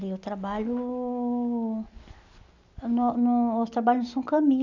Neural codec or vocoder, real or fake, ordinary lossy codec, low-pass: none; real; none; 7.2 kHz